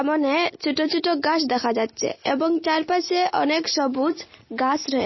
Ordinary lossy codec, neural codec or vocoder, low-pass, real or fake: MP3, 24 kbps; none; 7.2 kHz; real